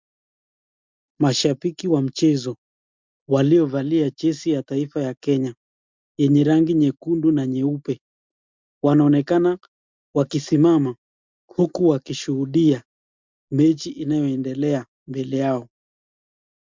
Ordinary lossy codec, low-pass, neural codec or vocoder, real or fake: MP3, 64 kbps; 7.2 kHz; none; real